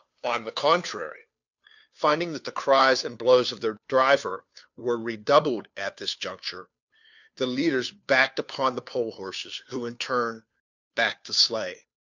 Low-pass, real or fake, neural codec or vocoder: 7.2 kHz; fake; codec, 16 kHz, 2 kbps, FunCodec, trained on Chinese and English, 25 frames a second